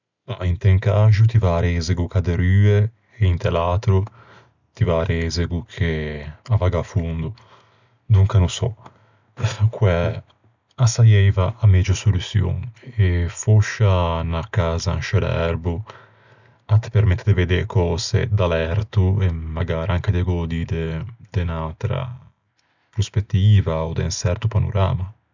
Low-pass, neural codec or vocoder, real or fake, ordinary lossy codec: 7.2 kHz; none; real; none